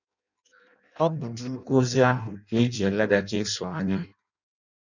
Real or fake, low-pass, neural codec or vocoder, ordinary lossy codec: fake; 7.2 kHz; codec, 16 kHz in and 24 kHz out, 0.6 kbps, FireRedTTS-2 codec; none